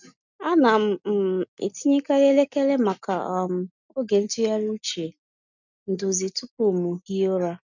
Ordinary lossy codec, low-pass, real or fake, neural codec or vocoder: none; 7.2 kHz; real; none